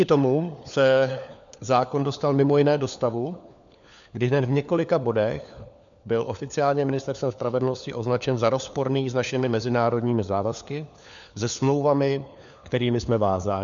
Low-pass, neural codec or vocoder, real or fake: 7.2 kHz; codec, 16 kHz, 4 kbps, FunCodec, trained on LibriTTS, 50 frames a second; fake